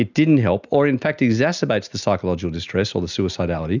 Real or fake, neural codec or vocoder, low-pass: real; none; 7.2 kHz